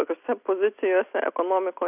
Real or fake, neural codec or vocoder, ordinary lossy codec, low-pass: real; none; AAC, 32 kbps; 3.6 kHz